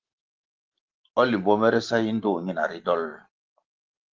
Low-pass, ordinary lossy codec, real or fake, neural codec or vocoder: 7.2 kHz; Opus, 16 kbps; real; none